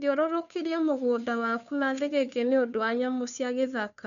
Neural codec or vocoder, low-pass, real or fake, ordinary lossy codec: codec, 16 kHz, 2 kbps, FunCodec, trained on Chinese and English, 25 frames a second; 7.2 kHz; fake; none